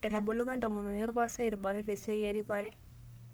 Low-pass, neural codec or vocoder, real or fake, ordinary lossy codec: none; codec, 44.1 kHz, 1.7 kbps, Pupu-Codec; fake; none